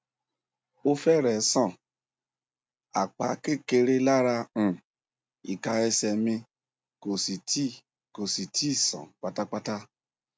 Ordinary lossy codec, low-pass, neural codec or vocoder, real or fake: none; none; none; real